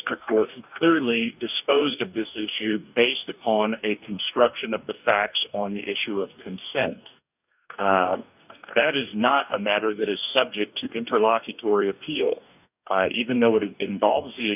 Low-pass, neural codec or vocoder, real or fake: 3.6 kHz; codec, 44.1 kHz, 2.6 kbps, DAC; fake